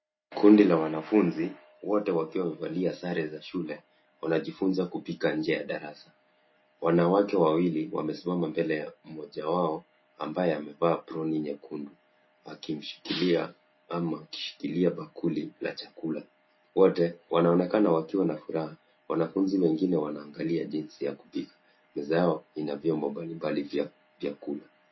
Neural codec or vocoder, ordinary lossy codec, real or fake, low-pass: none; MP3, 24 kbps; real; 7.2 kHz